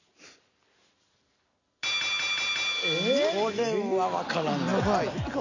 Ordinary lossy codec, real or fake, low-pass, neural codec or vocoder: none; real; 7.2 kHz; none